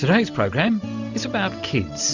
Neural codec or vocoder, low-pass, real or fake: none; 7.2 kHz; real